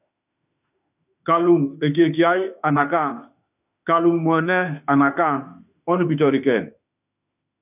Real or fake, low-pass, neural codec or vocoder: fake; 3.6 kHz; autoencoder, 48 kHz, 32 numbers a frame, DAC-VAE, trained on Japanese speech